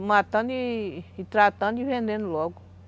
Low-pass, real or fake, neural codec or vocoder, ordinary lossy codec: none; real; none; none